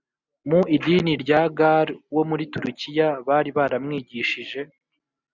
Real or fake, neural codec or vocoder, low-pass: real; none; 7.2 kHz